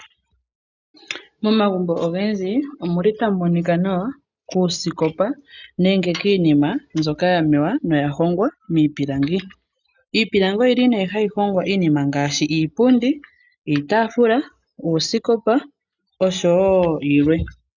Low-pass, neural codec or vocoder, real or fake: 7.2 kHz; none; real